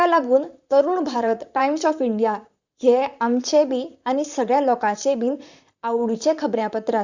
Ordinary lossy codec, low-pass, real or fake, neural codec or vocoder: Opus, 64 kbps; 7.2 kHz; fake; vocoder, 44.1 kHz, 128 mel bands, Pupu-Vocoder